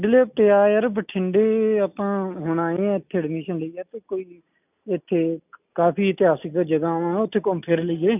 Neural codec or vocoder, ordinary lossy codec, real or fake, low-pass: none; none; real; 3.6 kHz